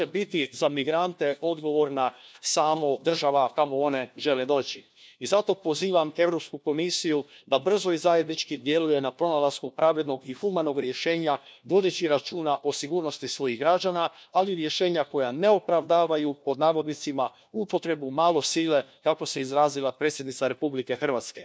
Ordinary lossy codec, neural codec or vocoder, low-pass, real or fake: none; codec, 16 kHz, 1 kbps, FunCodec, trained on LibriTTS, 50 frames a second; none; fake